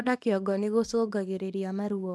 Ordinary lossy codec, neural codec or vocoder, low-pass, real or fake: none; codec, 24 kHz, 0.9 kbps, WavTokenizer, small release; none; fake